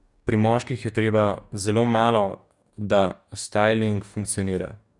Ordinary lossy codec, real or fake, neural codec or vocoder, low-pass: none; fake; codec, 44.1 kHz, 2.6 kbps, DAC; 10.8 kHz